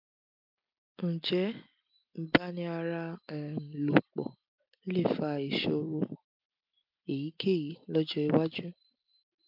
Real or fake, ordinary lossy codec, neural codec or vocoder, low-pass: real; MP3, 48 kbps; none; 5.4 kHz